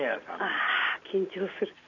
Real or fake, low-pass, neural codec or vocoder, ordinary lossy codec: real; 7.2 kHz; none; none